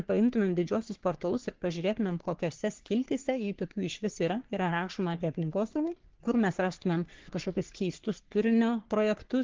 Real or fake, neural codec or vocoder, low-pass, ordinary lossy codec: fake; codec, 44.1 kHz, 3.4 kbps, Pupu-Codec; 7.2 kHz; Opus, 16 kbps